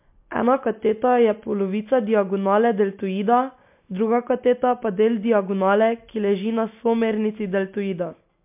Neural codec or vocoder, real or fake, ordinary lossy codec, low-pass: none; real; MP3, 32 kbps; 3.6 kHz